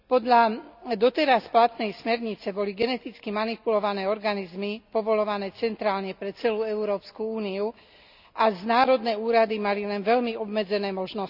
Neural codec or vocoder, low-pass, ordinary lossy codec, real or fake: none; 5.4 kHz; none; real